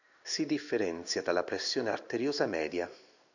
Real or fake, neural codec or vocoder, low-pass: fake; codec, 16 kHz in and 24 kHz out, 1 kbps, XY-Tokenizer; 7.2 kHz